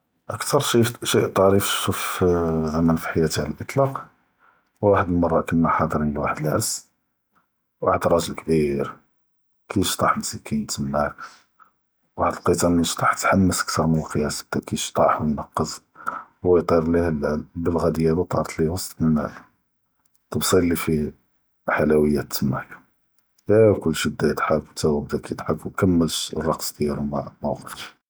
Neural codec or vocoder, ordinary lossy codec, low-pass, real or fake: none; none; none; real